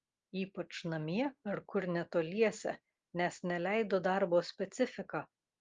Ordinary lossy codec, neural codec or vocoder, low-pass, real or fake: Opus, 32 kbps; none; 7.2 kHz; real